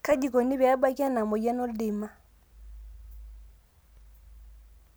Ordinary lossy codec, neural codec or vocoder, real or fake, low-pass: none; none; real; none